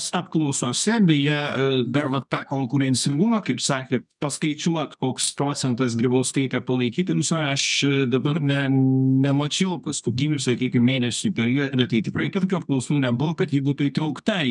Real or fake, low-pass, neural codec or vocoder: fake; 10.8 kHz; codec, 24 kHz, 0.9 kbps, WavTokenizer, medium music audio release